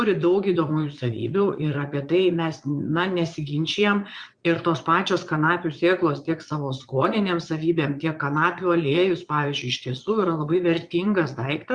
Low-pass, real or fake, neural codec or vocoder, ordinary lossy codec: 9.9 kHz; fake; vocoder, 22.05 kHz, 80 mel bands, Vocos; Opus, 64 kbps